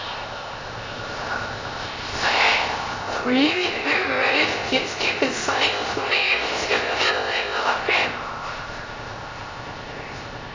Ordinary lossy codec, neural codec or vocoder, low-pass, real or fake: AAC, 32 kbps; codec, 16 kHz, 0.3 kbps, FocalCodec; 7.2 kHz; fake